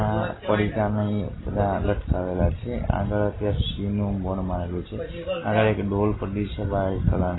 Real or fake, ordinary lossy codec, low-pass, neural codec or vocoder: real; AAC, 16 kbps; 7.2 kHz; none